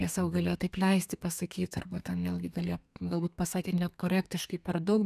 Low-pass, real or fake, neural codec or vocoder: 14.4 kHz; fake; codec, 32 kHz, 1.9 kbps, SNAC